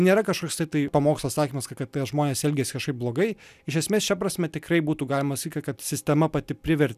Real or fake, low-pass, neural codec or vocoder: real; 14.4 kHz; none